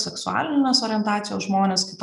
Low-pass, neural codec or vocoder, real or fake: 10.8 kHz; none; real